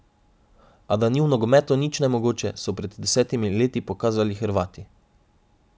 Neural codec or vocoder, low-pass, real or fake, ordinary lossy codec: none; none; real; none